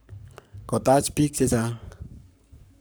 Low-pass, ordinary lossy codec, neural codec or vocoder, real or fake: none; none; codec, 44.1 kHz, 7.8 kbps, Pupu-Codec; fake